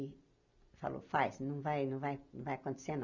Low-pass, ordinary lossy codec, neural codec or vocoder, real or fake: 7.2 kHz; none; none; real